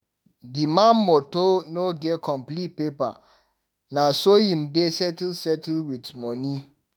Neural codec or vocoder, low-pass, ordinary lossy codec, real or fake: autoencoder, 48 kHz, 32 numbers a frame, DAC-VAE, trained on Japanese speech; none; none; fake